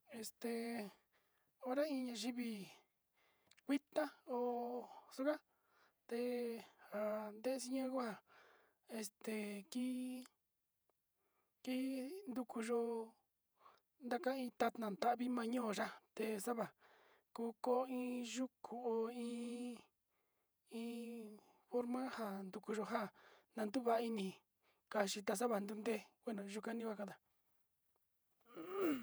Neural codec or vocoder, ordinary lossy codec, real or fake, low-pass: vocoder, 48 kHz, 128 mel bands, Vocos; none; fake; none